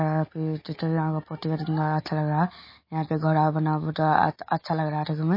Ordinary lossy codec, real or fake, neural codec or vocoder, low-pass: MP3, 24 kbps; real; none; 5.4 kHz